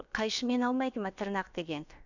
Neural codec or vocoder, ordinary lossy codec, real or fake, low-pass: codec, 16 kHz, about 1 kbps, DyCAST, with the encoder's durations; none; fake; 7.2 kHz